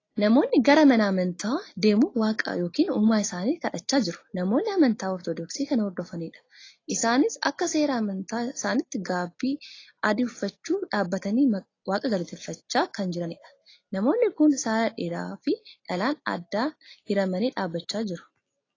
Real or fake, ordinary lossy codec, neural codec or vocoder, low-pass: real; AAC, 32 kbps; none; 7.2 kHz